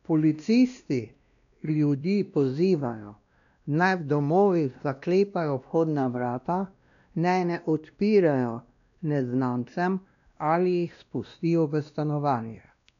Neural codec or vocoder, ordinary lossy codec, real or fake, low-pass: codec, 16 kHz, 1 kbps, X-Codec, WavLM features, trained on Multilingual LibriSpeech; none; fake; 7.2 kHz